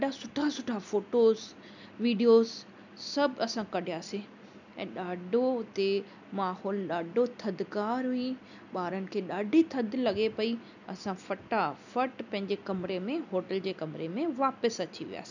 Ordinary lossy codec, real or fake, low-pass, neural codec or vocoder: none; real; 7.2 kHz; none